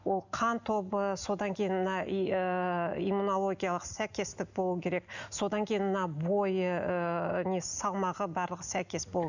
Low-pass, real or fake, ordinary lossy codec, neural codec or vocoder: 7.2 kHz; real; none; none